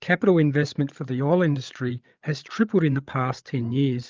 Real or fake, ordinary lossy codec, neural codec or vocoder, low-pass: fake; Opus, 24 kbps; codec, 16 kHz, 8 kbps, FreqCodec, larger model; 7.2 kHz